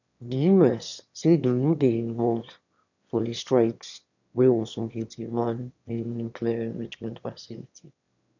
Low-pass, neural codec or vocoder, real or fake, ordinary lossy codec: 7.2 kHz; autoencoder, 22.05 kHz, a latent of 192 numbers a frame, VITS, trained on one speaker; fake; none